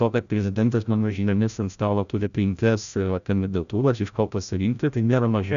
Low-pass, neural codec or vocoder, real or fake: 7.2 kHz; codec, 16 kHz, 0.5 kbps, FreqCodec, larger model; fake